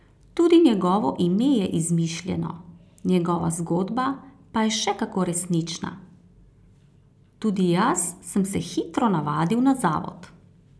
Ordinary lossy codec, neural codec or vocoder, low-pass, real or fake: none; none; none; real